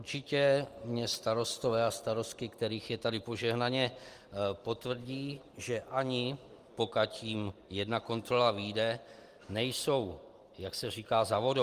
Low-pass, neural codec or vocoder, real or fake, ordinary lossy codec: 14.4 kHz; none; real; Opus, 24 kbps